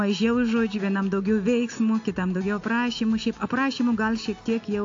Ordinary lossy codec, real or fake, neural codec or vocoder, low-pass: AAC, 48 kbps; real; none; 7.2 kHz